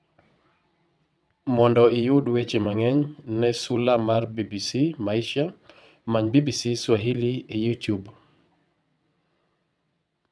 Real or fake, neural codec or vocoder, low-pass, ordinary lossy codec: fake; vocoder, 22.05 kHz, 80 mel bands, WaveNeXt; none; none